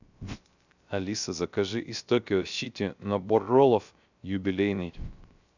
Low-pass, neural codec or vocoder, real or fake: 7.2 kHz; codec, 16 kHz, 0.3 kbps, FocalCodec; fake